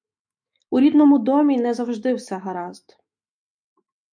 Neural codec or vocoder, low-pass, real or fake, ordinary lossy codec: autoencoder, 48 kHz, 128 numbers a frame, DAC-VAE, trained on Japanese speech; 9.9 kHz; fake; MP3, 64 kbps